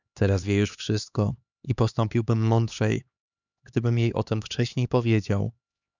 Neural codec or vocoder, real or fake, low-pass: codec, 16 kHz, 2 kbps, X-Codec, HuBERT features, trained on LibriSpeech; fake; 7.2 kHz